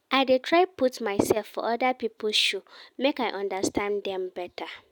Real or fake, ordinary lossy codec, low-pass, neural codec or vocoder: real; none; none; none